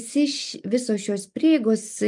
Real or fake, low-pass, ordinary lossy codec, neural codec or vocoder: real; 10.8 kHz; MP3, 96 kbps; none